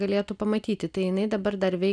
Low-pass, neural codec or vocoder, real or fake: 9.9 kHz; none; real